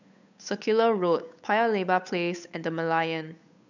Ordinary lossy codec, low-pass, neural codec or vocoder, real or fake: none; 7.2 kHz; codec, 16 kHz, 8 kbps, FunCodec, trained on Chinese and English, 25 frames a second; fake